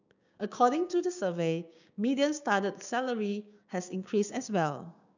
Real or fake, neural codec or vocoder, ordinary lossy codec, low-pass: fake; codec, 16 kHz, 6 kbps, DAC; none; 7.2 kHz